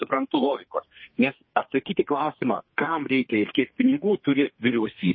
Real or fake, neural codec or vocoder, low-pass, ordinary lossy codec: fake; codec, 32 kHz, 1.9 kbps, SNAC; 7.2 kHz; MP3, 24 kbps